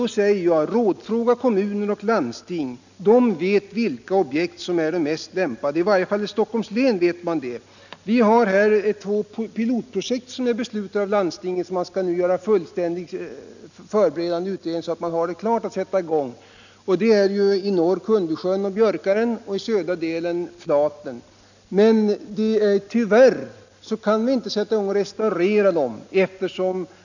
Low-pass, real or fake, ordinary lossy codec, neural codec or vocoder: 7.2 kHz; real; none; none